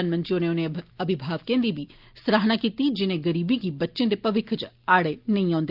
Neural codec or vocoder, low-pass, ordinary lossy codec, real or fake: none; 5.4 kHz; Opus, 32 kbps; real